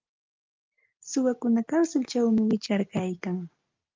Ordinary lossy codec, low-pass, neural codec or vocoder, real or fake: Opus, 24 kbps; 7.2 kHz; none; real